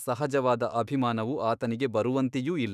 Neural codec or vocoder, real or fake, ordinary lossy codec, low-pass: autoencoder, 48 kHz, 128 numbers a frame, DAC-VAE, trained on Japanese speech; fake; none; 14.4 kHz